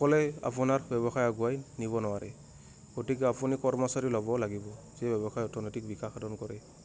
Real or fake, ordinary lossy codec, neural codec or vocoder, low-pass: real; none; none; none